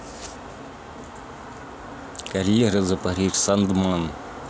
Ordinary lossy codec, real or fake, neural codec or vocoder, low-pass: none; real; none; none